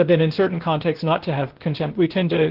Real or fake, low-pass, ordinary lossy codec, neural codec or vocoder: fake; 5.4 kHz; Opus, 16 kbps; codec, 16 kHz, 0.8 kbps, ZipCodec